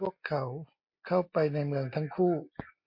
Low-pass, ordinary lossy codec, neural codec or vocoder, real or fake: 5.4 kHz; MP3, 32 kbps; none; real